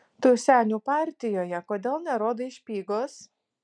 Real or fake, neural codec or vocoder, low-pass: real; none; 9.9 kHz